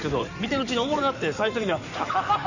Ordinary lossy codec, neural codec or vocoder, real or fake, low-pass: none; codec, 16 kHz in and 24 kHz out, 2.2 kbps, FireRedTTS-2 codec; fake; 7.2 kHz